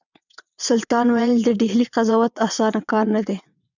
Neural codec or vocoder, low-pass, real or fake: vocoder, 22.05 kHz, 80 mel bands, WaveNeXt; 7.2 kHz; fake